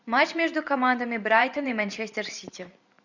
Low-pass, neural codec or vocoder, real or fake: 7.2 kHz; vocoder, 44.1 kHz, 128 mel bands every 512 samples, BigVGAN v2; fake